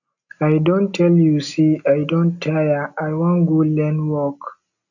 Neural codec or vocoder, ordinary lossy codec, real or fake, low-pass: none; none; real; 7.2 kHz